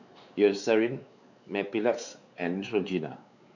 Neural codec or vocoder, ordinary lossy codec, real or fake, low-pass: codec, 16 kHz, 4 kbps, X-Codec, WavLM features, trained on Multilingual LibriSpeech; none; fake; 7.2 kHz